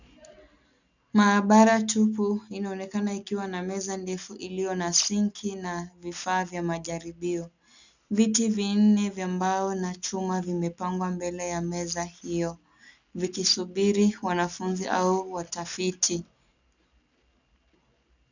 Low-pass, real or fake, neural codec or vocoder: 7.2 kHz; real; none